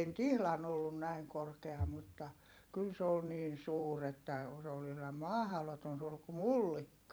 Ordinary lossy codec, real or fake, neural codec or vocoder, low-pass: none; fake; vocoder, 44.1 kHz, 128 mel bands every 512 samples, BigVGAN v2; none